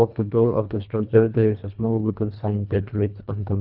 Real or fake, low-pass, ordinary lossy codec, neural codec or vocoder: fake; 5.4 kHz; none; codec, 24 kHz, 1.5 kbps, HILCodec